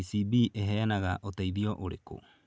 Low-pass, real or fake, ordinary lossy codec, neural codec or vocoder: none; real; none; none